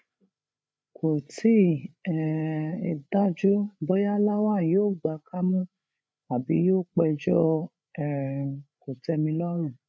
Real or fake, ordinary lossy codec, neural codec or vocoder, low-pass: fake; none; codec, 16 kHz, 8 kbps, FreqCodec, larger model; none